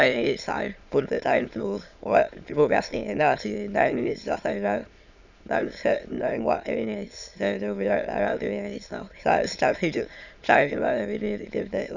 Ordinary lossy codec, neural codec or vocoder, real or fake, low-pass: none; autoencoder, 22.05 kHz, a latent of 192 numbers a frame, VITS, trained on many speakers; fake; 7.2 kHz